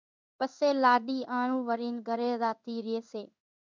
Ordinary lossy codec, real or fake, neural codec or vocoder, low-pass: MP3, 64 kbps; fake; codec, 16 kHz in and 24 kHz out, 1 kbps, XY-Tokenizer; 7.2 kHz